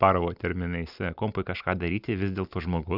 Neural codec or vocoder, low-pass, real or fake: none; 5.4 kHz; real